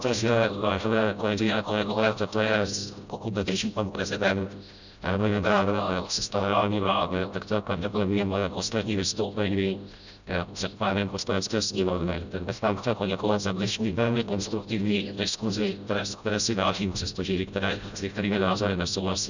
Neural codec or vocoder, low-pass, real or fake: codec, 16 kHz, 0.5 kbps, FreqCodec, smaller model; 7.2 kHz; fake